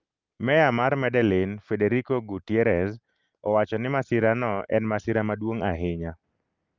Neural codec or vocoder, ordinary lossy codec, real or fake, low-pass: none; Opus, 24 kbps; real; 7.2 kHz